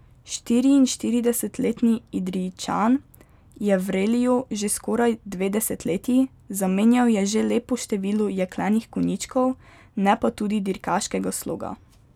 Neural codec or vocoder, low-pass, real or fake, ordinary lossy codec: none; 19.8 kHz; real; none